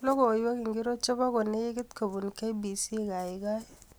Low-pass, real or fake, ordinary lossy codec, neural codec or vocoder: none; real; none; none